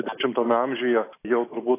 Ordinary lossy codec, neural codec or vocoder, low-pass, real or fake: AAC, 24 kbps; none; 3.6 kHz; real